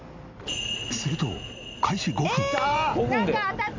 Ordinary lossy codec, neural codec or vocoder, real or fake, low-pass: MP3, 64 kbps; none; real; 7.2 kHz